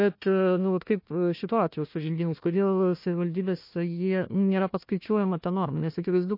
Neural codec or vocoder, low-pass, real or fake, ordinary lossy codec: codec, 16 kHz, 1 kbps, FunCodec, trained on Chinese and English, 50 frames a second; 5.4 kHz; fake; MP3, 32 kbps